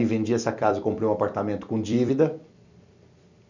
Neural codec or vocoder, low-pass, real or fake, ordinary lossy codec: vocoder, 44.1 kHz, 128 mel bands every 256 samples, BigVGAN v2; 7.2 kHz; fake; none